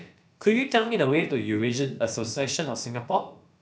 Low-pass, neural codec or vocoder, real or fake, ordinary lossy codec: none; codec, 16 kHz, about 1 kbps, DyCAST, with the encoder's durations; fake; none